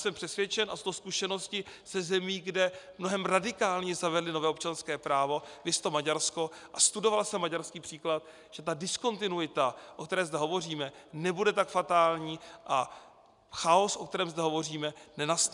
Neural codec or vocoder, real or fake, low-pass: none; real; 10.8 kHz